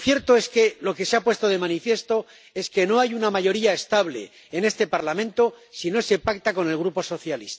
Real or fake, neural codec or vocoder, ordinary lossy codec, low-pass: real; none; none; none